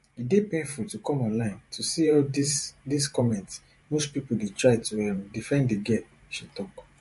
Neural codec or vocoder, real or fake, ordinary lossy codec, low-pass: vocoder, 44.1 kHz, 128 mel bands every 512 samples, BigVGAN v2; fake; MP3, 48 kbps; 14.4 kHz